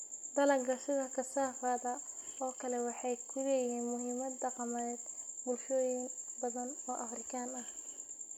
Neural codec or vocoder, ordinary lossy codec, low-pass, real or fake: none; none; 19.8 kHz; real